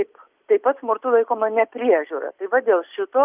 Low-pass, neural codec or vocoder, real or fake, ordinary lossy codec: 3.6 kHz; none; real; Opus, 24 kbps